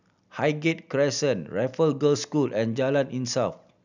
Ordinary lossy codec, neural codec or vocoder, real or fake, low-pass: none; none; real; 7.2 kHz